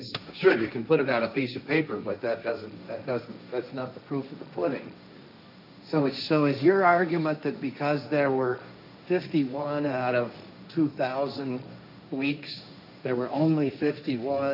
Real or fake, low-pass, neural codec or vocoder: fake; 5.4 kHz; codec, 16 kHz, 1.1 kbps, Voila-Tokenizer